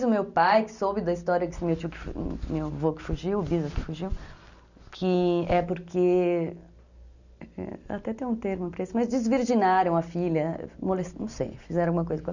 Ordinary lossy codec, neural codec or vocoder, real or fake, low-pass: none; none; real; 7.2 kHz